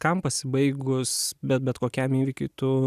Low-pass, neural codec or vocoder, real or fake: 14.4 kHz; none; real